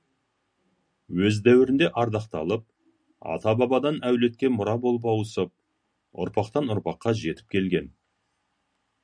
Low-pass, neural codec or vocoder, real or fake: 9.9 kHz; none; real